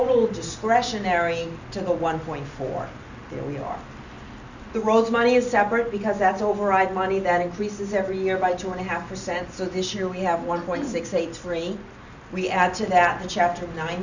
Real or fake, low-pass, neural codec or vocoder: real; 7.2 kHz; none